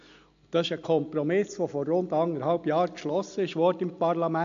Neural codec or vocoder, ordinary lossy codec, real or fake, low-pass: none; AAC, 64 kbps; real; 7.2 kHz